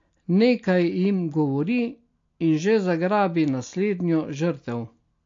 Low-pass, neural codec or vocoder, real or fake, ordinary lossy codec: 7.2 kHz; none; real; AAC, 48 kbps